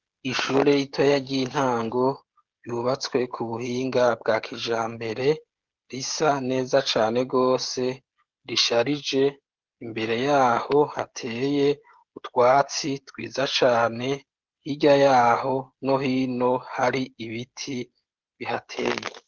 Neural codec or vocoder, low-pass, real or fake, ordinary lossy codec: codec, 16 kHz, 16 kbps, FreqCodec, smaller model; 7.2 kHz; fake; Opus, 16 kbps